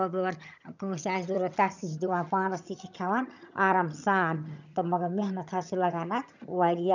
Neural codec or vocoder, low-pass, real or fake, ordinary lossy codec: vocoder, 22.05 kHz, 80 mel bands, HiFi-GAN; 7.2 kHz; fake; none